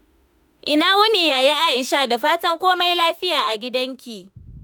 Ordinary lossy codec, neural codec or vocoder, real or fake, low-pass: none; autoencoder, 48 kHz, 32 numbers a frame, DAC-VAE, trained on Japanese speech; fake; none